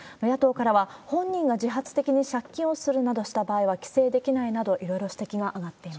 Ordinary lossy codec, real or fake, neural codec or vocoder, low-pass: none; real; none; none